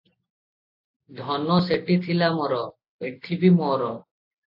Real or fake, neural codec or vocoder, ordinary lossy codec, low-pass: real; none; AAC, 48 kbps; 5.4 kHz